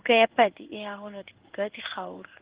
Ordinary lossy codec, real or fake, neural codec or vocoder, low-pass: Opus, 16 kbps; fake; codec, 16 kHz in and 24 kHz out, 1 kbps, XY-Tokenizer; 3.6 kHz